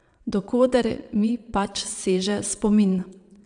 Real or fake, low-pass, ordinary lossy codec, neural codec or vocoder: fake; 9.9 kHz; none; vocoder, 22.05 kHz, 80 mel bands, Vocos